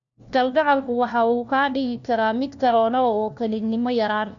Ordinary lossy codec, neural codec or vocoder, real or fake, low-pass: none; codec, 16 kHz, 1 kbps, FunCodec, trained on LibriTTS, 50 frames a second; fake; 7.2 kHz